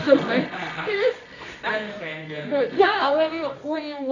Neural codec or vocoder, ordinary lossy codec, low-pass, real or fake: codec, 24 kHz, 0.9 kbps, WavTokenizer, medium music audio release; none; 7.2 kHz; fake